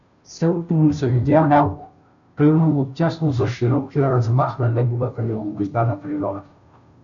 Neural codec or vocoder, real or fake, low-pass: codec, 16 kHz, 0.5 kbps, FunCodec, trained on Chinese and English, 25 frames a second; fake; 7.2 kHz